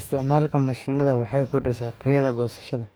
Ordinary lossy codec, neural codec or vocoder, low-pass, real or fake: none; codec, 44.1 kHz, 2.6 kbps, DAC; none; fake